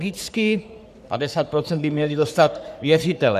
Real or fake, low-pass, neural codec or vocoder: fake; 14.4 kHz; codec, 44.1 kHz, 3.4 kbps, Pupu-Codec